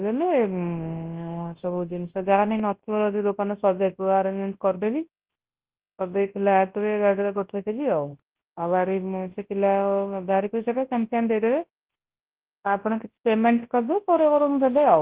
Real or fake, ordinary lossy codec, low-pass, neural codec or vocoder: fake; Opus, 16 kbps; 3.6 kHz; codec, 24 kHz, 0.9 kbps, WavTokenizer, large speech release